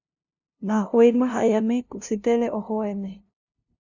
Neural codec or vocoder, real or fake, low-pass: codec, 16 kHz, 0.5 kbps, FunCodec, trained on LibriTTS, 25 frames a second; fake; 7.2 kHz